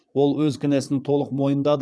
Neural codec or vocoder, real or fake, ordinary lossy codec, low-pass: vocoder, 22.05 kHz, 80 mel bands, Vocos; fake; none; none